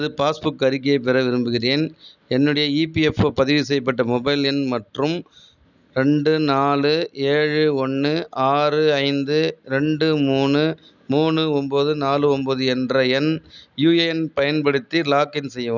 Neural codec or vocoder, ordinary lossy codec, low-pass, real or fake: none; none; 7.2 kHz; real